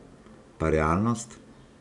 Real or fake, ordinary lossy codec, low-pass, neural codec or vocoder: real; none; 10.8 kHz; none